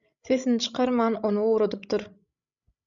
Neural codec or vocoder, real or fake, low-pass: codec, 16 kHz, 16 kbps, FreqCodec, larger model; fake; 7.2 kHz